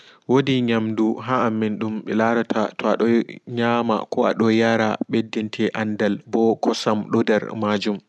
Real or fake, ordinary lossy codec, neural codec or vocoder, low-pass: real; none; none; none